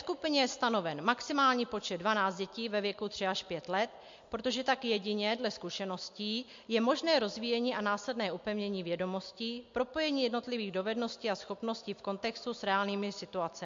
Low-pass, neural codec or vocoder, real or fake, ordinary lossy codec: 7.2 kHz; none; real; MP3, 48 kbps